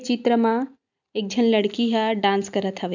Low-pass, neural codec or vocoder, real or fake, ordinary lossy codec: 7.2 kHz; none; real; none